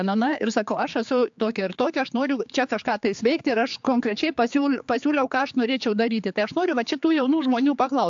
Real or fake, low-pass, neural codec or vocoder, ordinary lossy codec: fake; 7.2 kHz; codec, 16 kHz, 4 kbps, X-Codec, HuBERT features, trained on general audio; AAC, 64 kbps